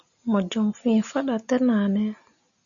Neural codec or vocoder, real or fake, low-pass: none; real; 7.2 kHz